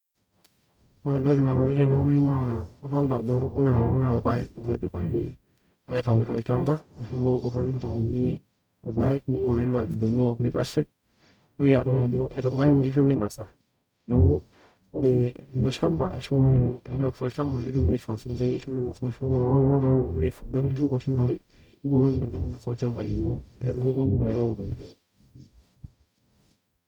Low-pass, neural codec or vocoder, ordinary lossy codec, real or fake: 19.8 kHz; codec, 44.1 kHz, 0.9 kbps, DAC; none; fake